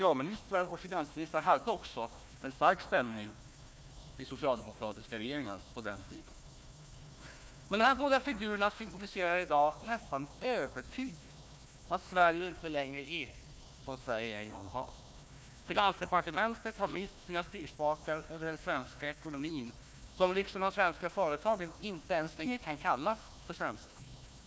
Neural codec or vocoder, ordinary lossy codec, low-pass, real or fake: codec, 16 kHz, 1 kbps, FunCodec, trained on Chinese and English, 50 frames a second; none; none; fake